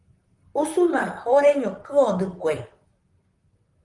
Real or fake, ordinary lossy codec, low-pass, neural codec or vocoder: fake; Opus, 32 kbps; 10.8 kHz; vocoder, 44.1 kHz, 128 mel bands, Pupu-Vocoder